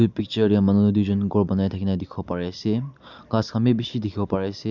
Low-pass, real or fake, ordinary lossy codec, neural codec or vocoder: 7.2 kHz; real; none; none